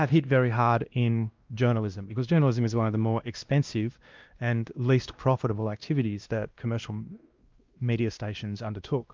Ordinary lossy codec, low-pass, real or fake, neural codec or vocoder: Opus, 32 kbps; 7.2 kHz; fake; codec, 16 kHz, 1 kbps, X-Codec, WavLM features, trained on Multilingual LibriSpeech